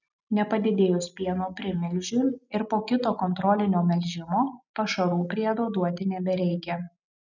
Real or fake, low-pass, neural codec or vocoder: real; 7.2 kHz; none